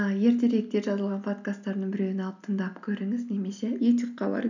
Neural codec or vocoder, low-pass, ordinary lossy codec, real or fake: none; 7.2 kHz; none; real